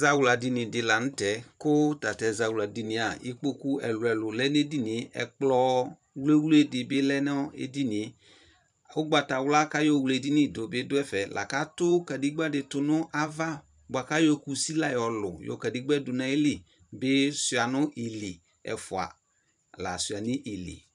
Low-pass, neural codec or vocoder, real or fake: 10.8 kHz; vocoder, 44.1 kHz, 128 mel bands every 512 samples, BigVGAN v2; fake